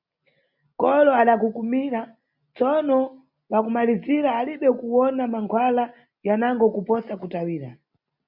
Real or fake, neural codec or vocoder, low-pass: real; none; 5.4 kHz